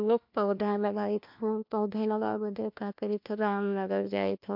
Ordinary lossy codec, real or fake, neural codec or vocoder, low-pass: MP3, 48 kbps; fake; codec, 16 kHz, 1 kbps, FunCodec, trained on LibriTTS, 50 frames a second; 5.4 kHz